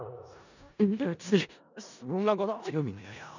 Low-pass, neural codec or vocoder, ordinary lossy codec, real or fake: 7.2 kHz; codec, 16 kHz in and 24 kHz out, 0.4 kbps, LongCat-Audio-Codec, four codebook decoder; none; fake